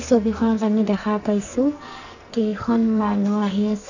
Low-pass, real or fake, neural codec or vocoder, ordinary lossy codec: 7.2 kHz; fake; codec, 44.1 kHz, 2.6 kbps, SNAC; none